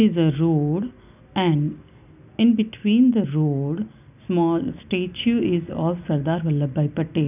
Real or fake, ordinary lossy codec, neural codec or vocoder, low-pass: real; none; none; 3.6 kHz